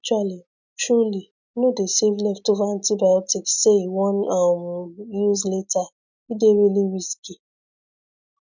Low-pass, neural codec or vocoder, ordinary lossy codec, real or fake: 7.2 kHz; none; none; real